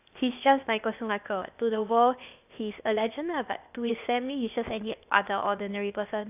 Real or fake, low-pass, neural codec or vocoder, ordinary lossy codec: fake; 3.6 kHz; codec, 16 kHz, 0.8 kbps, ZipCodec; none